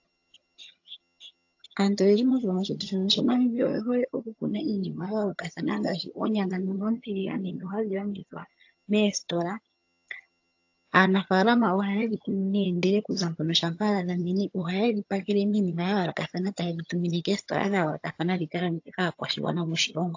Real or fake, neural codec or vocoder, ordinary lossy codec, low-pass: fake; vocoder, 22.05 kHz, 80 mel bands, HiFi-GAN; AAC, 48 kbps; 7.2 kHz